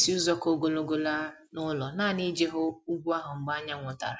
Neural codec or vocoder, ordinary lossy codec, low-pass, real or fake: none; none; none; real